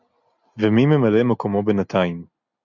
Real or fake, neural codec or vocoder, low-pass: real; none; 7.2 kHz